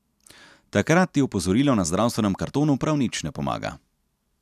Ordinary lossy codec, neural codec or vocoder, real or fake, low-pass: none; none; real; 14.4 kHz